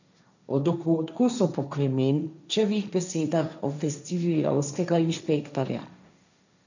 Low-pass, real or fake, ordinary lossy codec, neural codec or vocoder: 7.2 kHz; fake; none; codec, 16 kHz, 1.1 kbps, Voila-Tokenizer